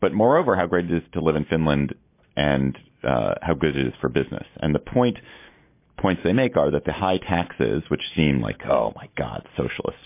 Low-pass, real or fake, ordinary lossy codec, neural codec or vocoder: 3.6 kHz; real; MP3, 24 kbps; none